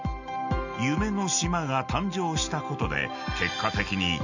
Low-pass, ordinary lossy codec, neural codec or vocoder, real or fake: 7.2 kHz; none; none; real